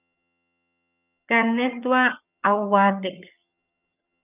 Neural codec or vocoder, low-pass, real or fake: vocoder, 22.05 kHz, 80 mel bands, HiFi-GAN; 3.6 kHz; fake